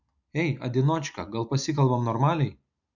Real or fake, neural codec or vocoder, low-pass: real; none; 7.2 kHz